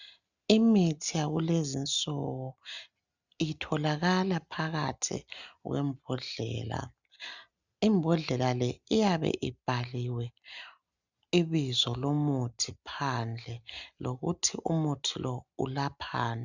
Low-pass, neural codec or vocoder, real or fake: 7.2 kHz; none; real